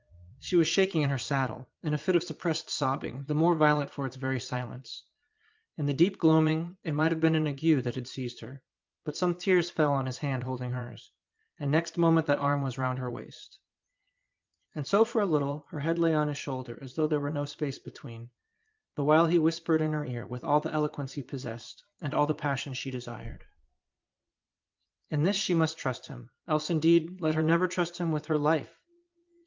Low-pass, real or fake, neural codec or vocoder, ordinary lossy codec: 7.2 kHz; fake; vocoder, 22.05 kHz, 80 mel bands, Vocos; Opus, 32 kbps